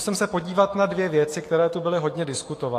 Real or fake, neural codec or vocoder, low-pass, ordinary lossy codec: fake; autoencoder, 48 kHz, 128 numbers a frame, DAC-VAE, trained on Japanese speech; 14.4 kHz; AAC, 48 kbps